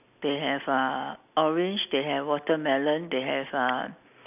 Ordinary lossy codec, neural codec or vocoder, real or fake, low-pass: none; none; real; 3.6 kHz